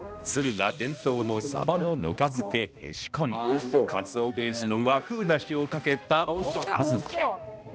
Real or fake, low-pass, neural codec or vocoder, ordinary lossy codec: fake; none; codec, 16 kHz, 1 kbps, X-Codec, HuBERT features, trained on balanced general audio; none